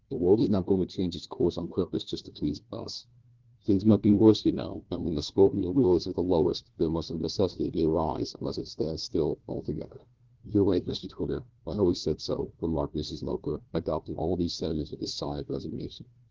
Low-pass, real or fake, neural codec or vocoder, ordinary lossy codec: 7.2 kHz; fake; codec, 16 kHz, 1 kbps, FunCodec, trained on Chinese and English, 50 frames a second; Opus, 16 kbps